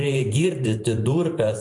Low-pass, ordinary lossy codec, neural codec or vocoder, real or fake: 10.8 kHz; AAC, 48 kbps; vocoder, 44.1 kHz, 128 mel bands every 512 samples, BigVGAN v2; fake